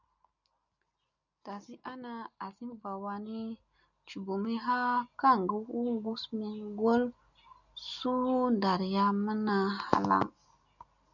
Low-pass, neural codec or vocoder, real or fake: 7.2 kHz; none; real